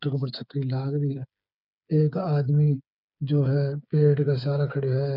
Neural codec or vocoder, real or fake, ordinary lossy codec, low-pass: codec, 16 kHz, 8 kbps, FreqCodec, smaller model; fake; none; 5.4 kHz